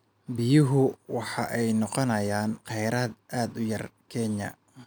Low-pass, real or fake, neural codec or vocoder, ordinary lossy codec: none; real; none; none